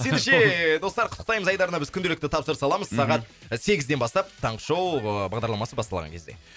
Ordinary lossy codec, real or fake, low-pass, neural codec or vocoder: none; real; none; none